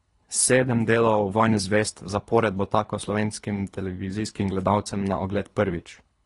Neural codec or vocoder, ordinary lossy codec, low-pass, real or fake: codec, 24 kHz, 3 kbps, HILCodec; AAC, 32 kbps; 10.8 kHz; fake